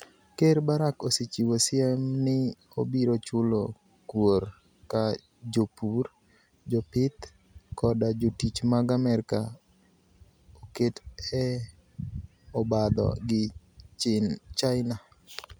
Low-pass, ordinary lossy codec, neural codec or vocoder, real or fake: none; none; none; real